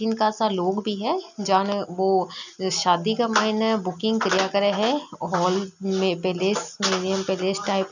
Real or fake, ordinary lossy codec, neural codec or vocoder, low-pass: real; none; none; 7.2 kHz